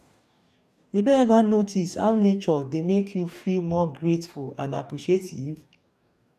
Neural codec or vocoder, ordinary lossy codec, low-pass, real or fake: codec, 44.1 kHz, 2.6 kbps, DAC; none; 14.4 kHz; fake